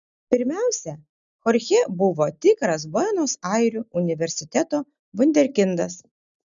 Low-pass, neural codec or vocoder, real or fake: 7.2 kHz; none; real